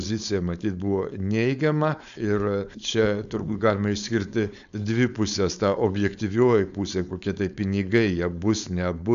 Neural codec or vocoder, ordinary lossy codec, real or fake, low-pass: codec, 16 kHz, 4.8 kbps, FACodec; AAC, 96 kbps; fake; 7.2 kHz